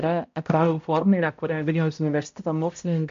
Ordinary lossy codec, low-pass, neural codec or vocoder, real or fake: MP3, 48 kbps; 7.2 kHz; codec, 16 kHz, 0.5 kbps, X-Codec, HuBERT features, trained on balanced general audio; fake